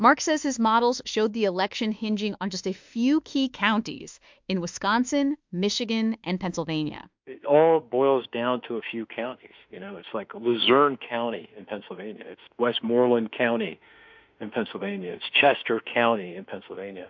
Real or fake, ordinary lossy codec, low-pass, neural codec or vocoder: fake; MP3, 64 kbps; 7.2 kHz; autoencoder, 48 kHz, 32 numbers a frame, DAC-VAE, trained on Japanese speech